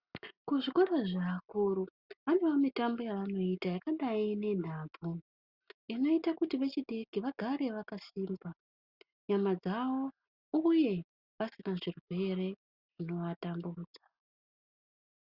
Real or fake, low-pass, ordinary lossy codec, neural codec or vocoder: real; 5.4 kHz; Opus, 64 kbps; none